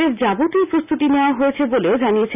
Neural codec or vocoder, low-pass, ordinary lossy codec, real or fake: none; 3.6 kHz; none; real